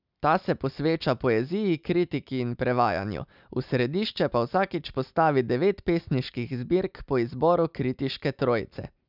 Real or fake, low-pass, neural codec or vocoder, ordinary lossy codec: real; 5.4 kHz; none; none